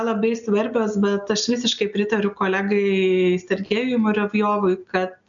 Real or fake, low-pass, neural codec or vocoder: real; 7.2 kHz; none